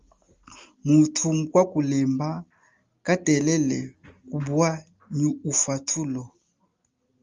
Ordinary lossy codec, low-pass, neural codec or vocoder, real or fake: Opus, 32 kbps; 7.2 kHz; none; real